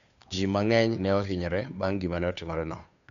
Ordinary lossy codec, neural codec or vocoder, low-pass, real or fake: MP3, 96 kbps; codec, 16 kHz, 2 kbps, FunCodec, trained on Chinese and English, 25 frames a second; 7.2 kHz; fake